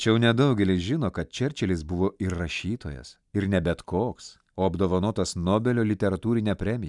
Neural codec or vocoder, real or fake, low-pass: none; real; 10.8 kHz